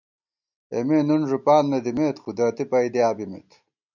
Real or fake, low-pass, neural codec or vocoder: real; 7.2 kHz; none